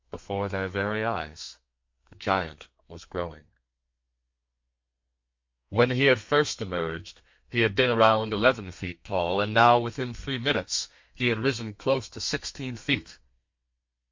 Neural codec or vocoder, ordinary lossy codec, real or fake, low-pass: codec, 32 kHz, 1.9 kbps, SNAC; MP3, 48 kbps; fake; 7.2 kHz